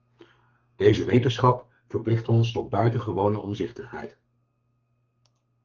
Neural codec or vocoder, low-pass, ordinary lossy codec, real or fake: codec, 32 kHz, 1.9 kbps, SNAC; 7.2 kHz; Opus, 32 kbps; fake